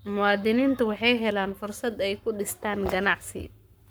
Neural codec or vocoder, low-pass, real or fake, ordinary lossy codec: codec, 44.1 kHz, 7.8 kbps, Pupu-Codec; none; fake; none